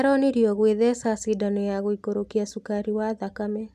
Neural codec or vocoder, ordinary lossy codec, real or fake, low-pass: none; none; real; 14.4 kHz